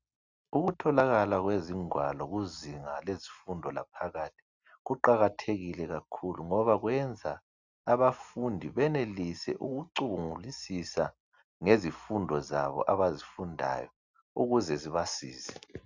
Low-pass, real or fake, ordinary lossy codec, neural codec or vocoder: 7.2 kHz; real; Opus, 64 kbps; none